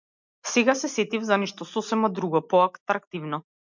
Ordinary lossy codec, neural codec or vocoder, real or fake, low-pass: MP3, 64 kbps; none; real; 7.2 kHz